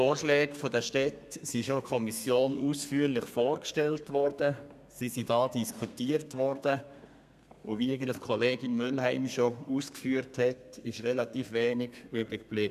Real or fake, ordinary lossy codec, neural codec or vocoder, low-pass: fake; none; codec, 32 kHz, 1.9 kbps, SNAC; 14.4 kHz